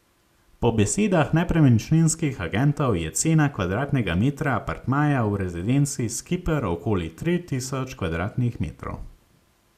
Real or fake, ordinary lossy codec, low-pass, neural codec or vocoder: real; Opus, 64 kbps; 14.4 kHz; none